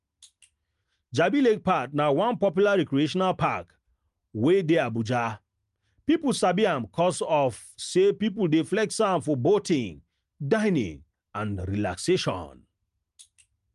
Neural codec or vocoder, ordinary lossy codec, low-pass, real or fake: none; Opus, 32 kbps; 10.8 kHz; real